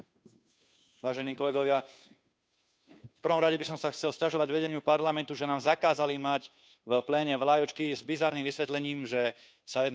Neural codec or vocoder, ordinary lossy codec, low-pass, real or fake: codec, 16 kHz, 2 kbps, FunCodec, trained on Chinese and English, 25 frames a second; none; none; fake